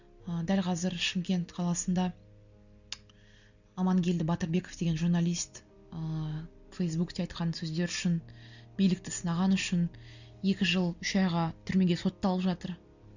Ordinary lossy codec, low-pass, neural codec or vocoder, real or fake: none; 7.2 kHz; none; real